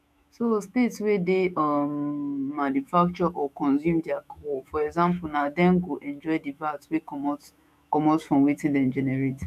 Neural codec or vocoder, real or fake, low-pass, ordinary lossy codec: autoencoder, 48 kHz, 128 numbers a frame, DAC-VAE, trained on Japanese speech; fake; 14.4 kHz; none